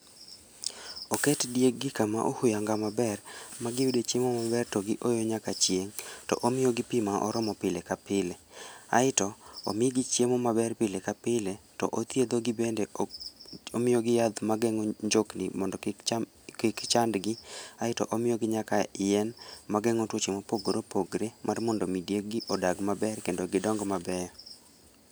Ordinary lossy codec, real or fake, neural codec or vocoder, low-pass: none; real; none; none